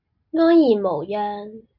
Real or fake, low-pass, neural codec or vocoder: real; 5.4 kHz; none